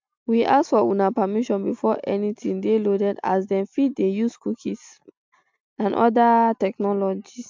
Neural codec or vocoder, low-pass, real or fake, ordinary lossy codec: none; 7.2 kHz; real; MP3, 64 kbps